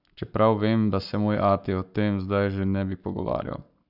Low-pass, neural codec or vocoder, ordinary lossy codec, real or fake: 5.4 kHz; codec, 44.1 kHz, 7.8 kbps, Pupu-Codec; none; fake